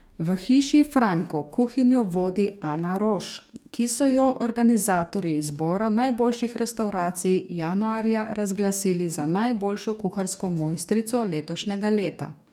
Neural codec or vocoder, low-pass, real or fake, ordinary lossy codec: codec, 44.1 kHz, 2.6 kbps, DAC; 19.8 kHz; fake; none